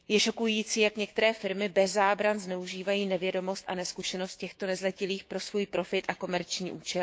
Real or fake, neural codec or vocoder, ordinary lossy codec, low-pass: fake; codec, 16 kHz, 6 kbps, DAC; none; none